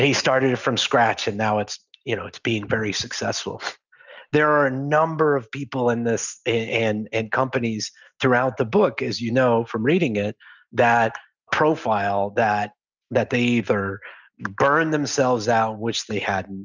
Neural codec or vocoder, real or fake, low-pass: none; real; 7.2 kHz